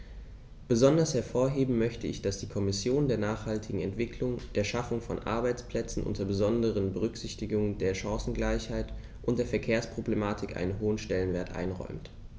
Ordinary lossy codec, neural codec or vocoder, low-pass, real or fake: none; none; none; real